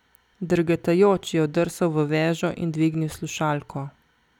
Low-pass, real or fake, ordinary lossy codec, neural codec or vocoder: 19.8 kHz; real; none; none